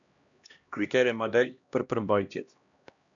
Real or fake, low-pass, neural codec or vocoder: fake; 7.2 kHz; codec, 16 kHz, 1 kbps, X-Codec, HuBERT features, trained on LibriSpeech